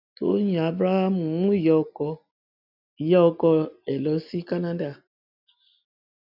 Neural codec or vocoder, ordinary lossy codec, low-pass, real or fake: none; none; 5.4 kHz; real